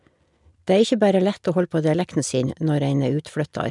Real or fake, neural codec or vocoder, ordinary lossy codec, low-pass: real; none; none; 10.8 kHz